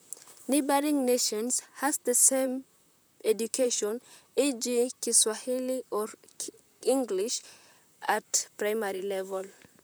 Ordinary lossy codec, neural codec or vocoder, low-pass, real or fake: none; vocoder, 44.1 kHz, 128 mel bands, Pupu-Vocoder; none; fake